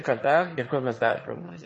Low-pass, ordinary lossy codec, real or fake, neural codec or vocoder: 9.9 kHz; MP3, 32 kbps; fake; autoencoder, 22.05 kHz, a latent of 192 numbers a frame, VITS, trained on one speaker